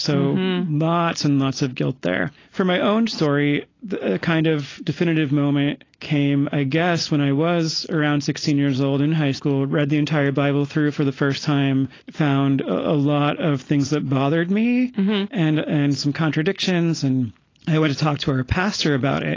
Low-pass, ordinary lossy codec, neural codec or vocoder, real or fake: 7.2 kHz; AAC, 32 kbps; none; real